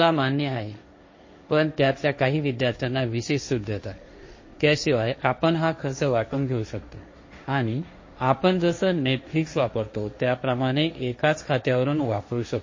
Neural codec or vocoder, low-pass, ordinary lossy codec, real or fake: codec, 16 kHz, 1.1 kbps, Voila-Tokenizer; 7.2 kHz; MP3, 32 kbps; fake